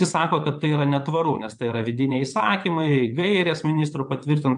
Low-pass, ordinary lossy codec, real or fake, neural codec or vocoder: 9.9 kHz; MP3, 64 kbps; fake; vocoder, 22.05 kHz, 80 mel bands, WaveNeXt